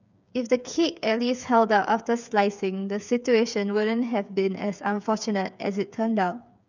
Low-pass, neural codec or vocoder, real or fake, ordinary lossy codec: 7.2 kHz; codec, 16 kHz, 8 kbps, FreqCodec, smaller model; fake; none